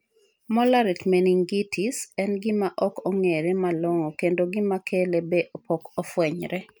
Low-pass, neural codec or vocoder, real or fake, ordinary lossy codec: none; none; real; none